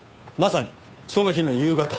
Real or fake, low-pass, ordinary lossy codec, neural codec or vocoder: fake; none; none; codec, 16 kHz, 2 kbps, FunCodec, trained on Chinese and English, 25 frames a second